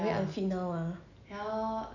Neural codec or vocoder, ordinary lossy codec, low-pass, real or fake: none; none; 7.2 kHz; real